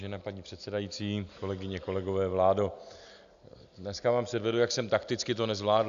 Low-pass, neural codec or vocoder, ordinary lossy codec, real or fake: 7.2 kHz; none; AAC, 96 kbps; real